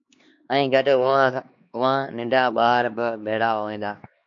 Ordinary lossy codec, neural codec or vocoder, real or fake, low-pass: MP3, 48 kbps; codec, 16 kHz, 2 kbps, X-Codec, HuBERT features, trained on LibriSpeech; fake; 7.2 kHz